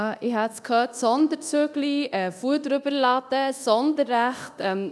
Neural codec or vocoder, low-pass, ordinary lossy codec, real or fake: codec, 24 kHz, 0.9 kbps, DualCodec; none; none; fake